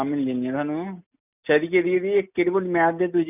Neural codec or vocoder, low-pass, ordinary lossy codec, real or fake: none; 3.6 kHz; none; real